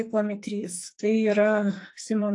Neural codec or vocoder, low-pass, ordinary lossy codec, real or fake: codec, 44.1 kHz, 2.6 kbps, SNAC; 10.8 kHz; AAC, 64 kbps; fake